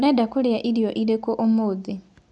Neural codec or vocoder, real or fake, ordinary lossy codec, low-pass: none; real; none; none